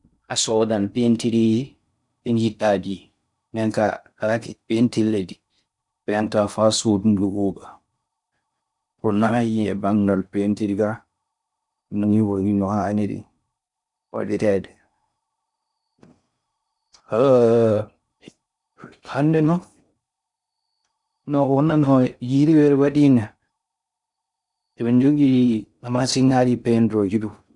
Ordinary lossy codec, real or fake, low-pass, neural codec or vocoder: none; fake; 10.8 kHz; codec, 16 kHz in and 24 kHz out, 0.6 kbps, FocalCodec, streaming, 4096 codes